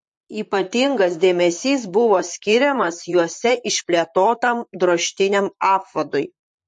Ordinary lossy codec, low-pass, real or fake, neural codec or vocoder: MP3, 48 kbps; 10.8 kHz; fake; vocoder, 44.1 kHz, 128 mel bands, Pupu-Vocoder